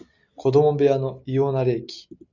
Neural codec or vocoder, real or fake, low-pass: none; real; 7.2 kHz